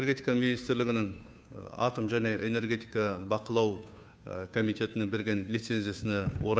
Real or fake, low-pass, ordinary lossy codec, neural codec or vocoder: fake; none; none; codec, 16 kHz, 2 kbps, FunCodec, trained on Chinese and English, 25 frames a second